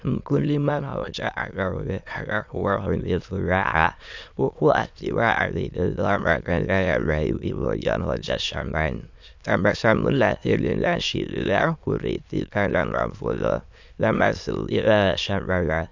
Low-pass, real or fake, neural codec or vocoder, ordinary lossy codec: 7.2 kHz; fake; autoencoder, 22.05 kHz, a latent of 192 numbers a frame, VITS, trained on many speakers; MP3, 64 kbps